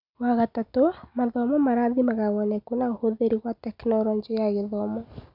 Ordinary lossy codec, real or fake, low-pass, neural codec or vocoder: none; real; 5.4 kHz; none